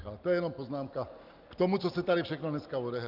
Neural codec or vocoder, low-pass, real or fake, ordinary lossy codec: none; 5.4 kHz; real; Opus, 32 kbps